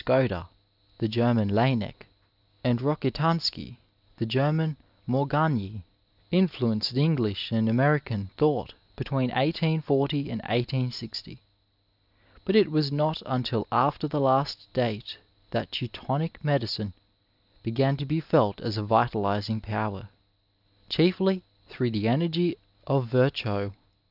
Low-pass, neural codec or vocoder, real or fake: 5.4 kHz; none; real